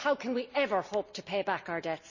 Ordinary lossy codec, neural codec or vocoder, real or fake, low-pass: none; none; real; 7.2 kHz